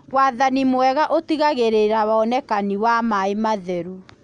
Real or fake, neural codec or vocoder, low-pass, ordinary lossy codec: real; none; 9.9 kHz; none